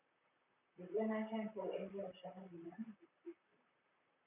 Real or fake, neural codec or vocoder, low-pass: fake; vocoder, 44.1 kHz, 128 mel bands, Pupu-Vocoder; 3.6 kHz